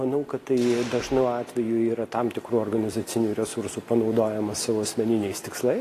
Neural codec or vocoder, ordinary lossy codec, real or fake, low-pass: none; AAC, 48 kbps; real; 14.4 kHz